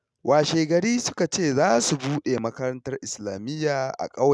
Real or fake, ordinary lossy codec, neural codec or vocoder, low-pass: real; none; none; none